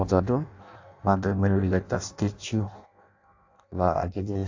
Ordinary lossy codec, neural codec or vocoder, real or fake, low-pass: AAC, 48 kbps; codec, 16 kHz in and 24 kHz out, 0.6 kbps, FireRedTTS-2 codec; fake; 7.2 kHz